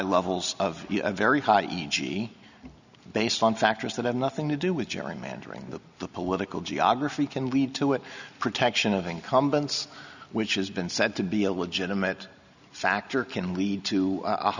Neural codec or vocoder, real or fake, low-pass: none; real; 7.2 kHz